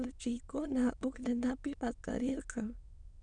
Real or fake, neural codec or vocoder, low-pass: fake; autoencoder, 22.05 kHz, a latent of 192 numbers a frame, VITS, trained on many speakers; 9.9 kHz